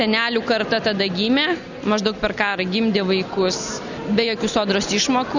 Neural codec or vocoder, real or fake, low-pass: none; real; 7.2 kHz